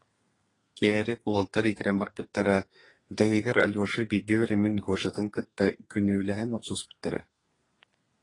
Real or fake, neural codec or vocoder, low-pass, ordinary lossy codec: fake; codec, 32 kHz, 1.9 kbps, SNAC; 10.8 kHz; AAC, 32 kbps